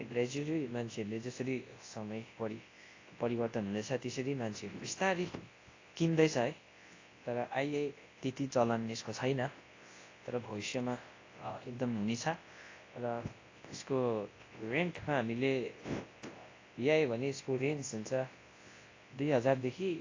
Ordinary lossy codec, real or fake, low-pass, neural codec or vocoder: AAC, 32 kbps; fake; 7.2 kHz; codec, 24 kHz, 0.9 kbps, WavTokenizer, large speech release